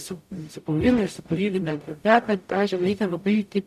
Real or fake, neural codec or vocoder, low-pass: fake; codec, 44.1 kHz, 0.9 kbps, DAC; 14.4 kHz